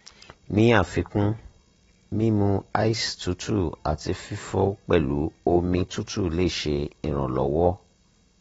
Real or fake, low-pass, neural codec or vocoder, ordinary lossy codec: real; 19.8 kHz; none; AAC, 24 kbps